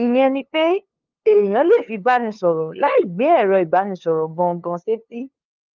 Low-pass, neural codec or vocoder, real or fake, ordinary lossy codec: 7.2 kHz; codec, 16 kHz, 2 kbps, FunCodec, trained on LibriTTS, 25 frames a second; fake; Opus, 24 kbps